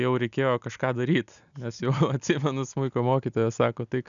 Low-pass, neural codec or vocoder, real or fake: 7.2 kHz; none; real